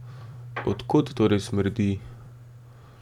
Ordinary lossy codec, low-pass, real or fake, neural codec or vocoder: none; 19.8 kHz; fake; vocoder, 44.1 kHz, 128 mel bands every 256 samples, BigVGAN v2